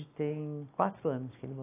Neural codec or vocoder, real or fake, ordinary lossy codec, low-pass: none; real; AAC, 16 kbps; 3.6 kHz